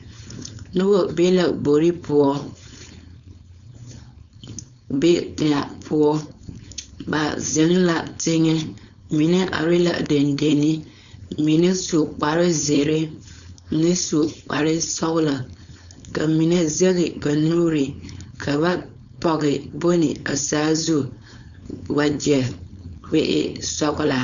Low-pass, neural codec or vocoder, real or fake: 7.2 kHz; codec, 16 kHz, 4.8 kbps, FACodec; fake